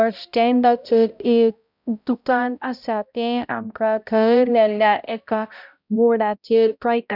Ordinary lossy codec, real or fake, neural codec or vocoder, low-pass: none; fake; codec, 16 kHz, 0.5 kbps, X-Codec, HuBERT features, trained on balanced general audio; 5.4 kHz